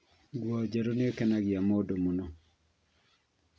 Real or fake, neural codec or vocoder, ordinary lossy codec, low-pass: real; none; none; none